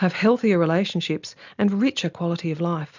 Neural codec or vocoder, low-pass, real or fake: none; 7.2 kHz; real